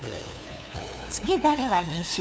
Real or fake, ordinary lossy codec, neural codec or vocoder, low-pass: fake; none; codec, 16 kHz, 2 kbps, FunCodec, trained on LibriTTS, 25 frames a second; none